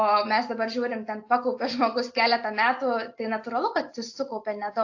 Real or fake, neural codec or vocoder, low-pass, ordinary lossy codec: real; none; 7.2 kHz; AAC, 48 kbps